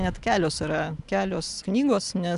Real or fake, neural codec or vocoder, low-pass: real; none; 10.8 kHz